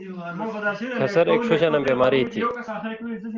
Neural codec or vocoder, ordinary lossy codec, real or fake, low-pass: none; Opus, 32 kbps; real; 7.2 kHz